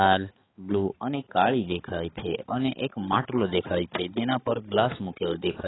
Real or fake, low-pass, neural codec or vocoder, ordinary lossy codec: fake; 7.2 kHz; codec, 16 kHz, 4 kbps, X-Codec, HuBERT features, trained on balanced general audio; AAC, 16 kbps